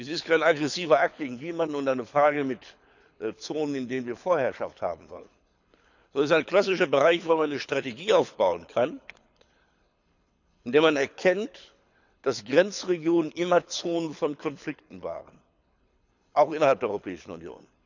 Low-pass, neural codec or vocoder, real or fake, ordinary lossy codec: 7.2 kHz; codec, 24 kHz, 6 kbps, HILCodec; fake; none